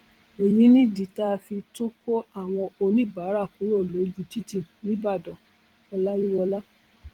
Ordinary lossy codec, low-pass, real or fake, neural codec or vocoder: Opus, 24 kbps; 19.8 kHz; fake; vocoder, 44.1 kHz, 128 mel bands every 256 samples, BigVGAN v2